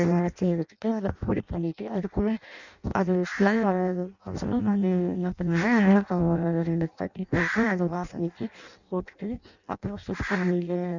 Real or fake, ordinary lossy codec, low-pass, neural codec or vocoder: fake; none; 7.2 kHz; codec, 16 kHz in and 24 kHz out, 0.6 kbps, FireRedTTS-2 codec